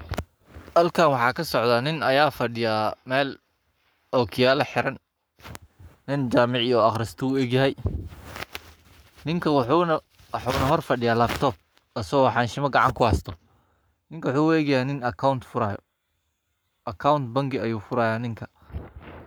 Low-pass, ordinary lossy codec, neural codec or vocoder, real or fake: none; none; codec, 44.1 kHz, 7.8 kbps, Pupu-Codec; fake